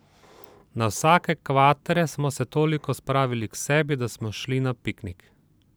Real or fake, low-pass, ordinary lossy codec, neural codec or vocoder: fake; none; none; vocoder, 44.1 kHz, 128 mel bands every 512 samples, BigVGAN v2